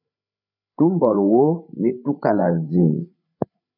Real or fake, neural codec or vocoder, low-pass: fake; codec, 16 kHz, 16 kbps, FreqCodec, larger model; 5.4 kHz